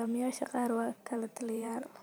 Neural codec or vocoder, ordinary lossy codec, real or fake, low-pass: vocoder, 44.1 kHz, 128 mel bands every 512 samples, BigVGAN v2; none; fake; none